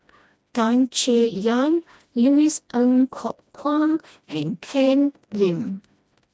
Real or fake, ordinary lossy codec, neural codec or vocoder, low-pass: fake; none; codec, 16 kHz, 1 kbps, FreqCodec, smaller model; none